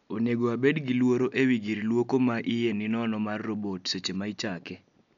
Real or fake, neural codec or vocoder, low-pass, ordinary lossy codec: real; none; 7.2 kHz; none